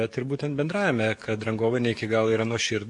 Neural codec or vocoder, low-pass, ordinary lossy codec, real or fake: codec, 44.1 kHz, 7.8 kbps, DAC; 10.8 kHz; MP3, 48 kbps; fake